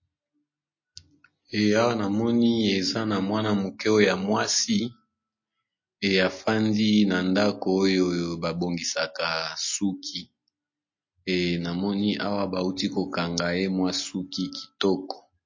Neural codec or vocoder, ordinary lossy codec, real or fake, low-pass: none; MP3, 32 kbps; real; 7.2 kHz